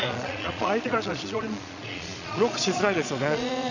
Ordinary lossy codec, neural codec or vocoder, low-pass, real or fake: none; vocoder, 22.05 kHz, 80 mel bands, Vocos; 7.2 kHz; fake